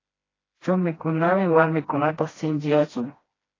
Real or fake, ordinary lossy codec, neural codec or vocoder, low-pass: fake; AAC, 32 kbps; codec, 16 kHz, 1 kbps, FreqCodec, smaller model; 7.2 kHz